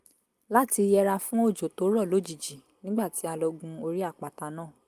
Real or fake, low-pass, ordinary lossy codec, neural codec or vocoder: real; 14.4 kHz; Opus, 32 kbps; none